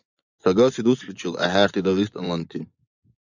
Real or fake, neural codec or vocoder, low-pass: fake; vocoder, 24 kHz, 100 mel bands, Vocos; 7.2 kHz